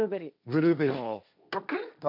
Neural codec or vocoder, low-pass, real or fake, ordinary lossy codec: codec, 16 kHz, 1.1 kbps, Voila-Tokenizer; 5.4 kHz; fake; none